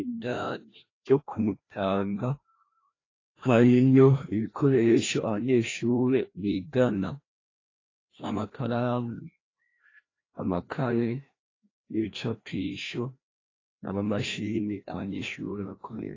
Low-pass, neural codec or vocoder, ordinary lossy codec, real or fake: 7.2 kHz; codec, 16 kHz, 1 kbps, FreqCodec, larger model; AAC, 32 kbps; fake